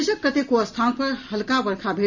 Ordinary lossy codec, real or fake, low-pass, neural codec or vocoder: none; real; 7.2 kHz; none